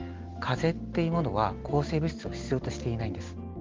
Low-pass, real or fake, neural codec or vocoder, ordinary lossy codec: 7.2 kHz; real; none; Opus, 16 kbps